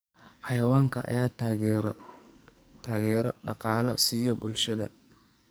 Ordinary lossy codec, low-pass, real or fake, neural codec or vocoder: none; none; fake; codec, 44.1 kHz, 2.6 kbps, SNAC